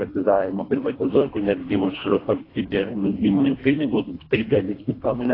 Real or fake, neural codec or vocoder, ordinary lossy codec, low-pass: fake; codec, 24 kHz, 1.5 kbps, HILCodec; AAC, 24 kbps; 5.4 kHz